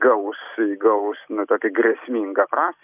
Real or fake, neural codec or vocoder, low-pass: real; none; 3.6 kHz